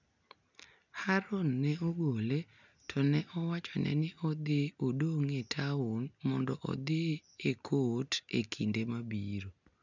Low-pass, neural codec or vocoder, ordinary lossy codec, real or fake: 7.2 kHz; none; none; real